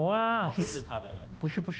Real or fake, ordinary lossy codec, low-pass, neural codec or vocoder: fake; none; none; codec, 16 kHz, 1 kbps, X-Codec, HuBERT features, trained on balanced general audio